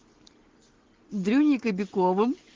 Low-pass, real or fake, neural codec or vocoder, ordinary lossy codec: 7.2 kHz; real; none; Opus, 16 kbps